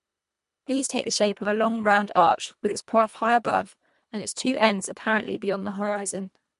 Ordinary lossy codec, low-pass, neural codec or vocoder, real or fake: MP3, 64 kbps; 10.8 kHz; codec, 24 kHz, 1.5 kbps, HILCodec; fake